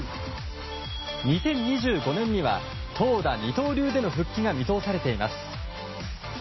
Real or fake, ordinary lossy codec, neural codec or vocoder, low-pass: real; MP3, 24 kbps; none; 7.2 kHz